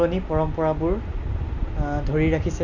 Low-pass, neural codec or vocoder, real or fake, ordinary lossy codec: 7.2 kHz; none; real; none